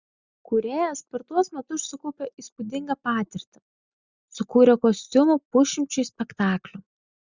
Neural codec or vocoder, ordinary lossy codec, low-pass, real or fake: none; Opus, 64 kbps; 7.2 kHz; real